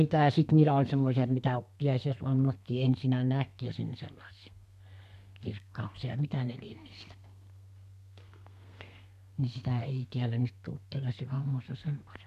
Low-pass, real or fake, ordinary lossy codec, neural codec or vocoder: 14.4 kHz; fake; none; codec, 32 kHz, 1.9 kbps, SNAC